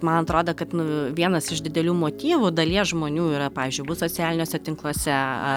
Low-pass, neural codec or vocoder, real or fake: 19.8 kHz; none; real